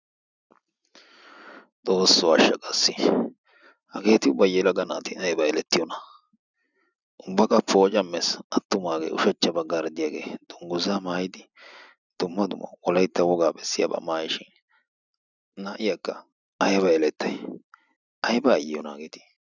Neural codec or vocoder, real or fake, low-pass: none; real; 7.2 kHz